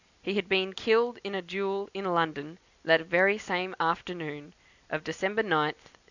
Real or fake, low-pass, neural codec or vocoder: real; 7.2 kHz; none